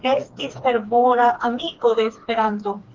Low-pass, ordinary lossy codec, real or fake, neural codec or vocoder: 7.2 kHz; Opus, 32 kbps; fake; codec, 16 kHz, 2 kbps, FreqCodec, smaller model